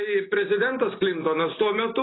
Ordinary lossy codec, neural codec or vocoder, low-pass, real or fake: AAC, 16 kbps; none; 7.2 kHz; real